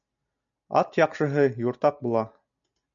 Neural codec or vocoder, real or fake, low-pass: none; real; 7.2 kHz